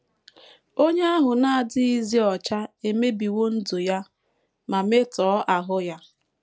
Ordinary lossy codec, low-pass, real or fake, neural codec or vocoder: none; none; real; none